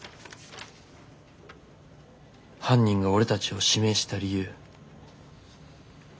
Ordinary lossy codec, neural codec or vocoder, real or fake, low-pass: none; none; real; none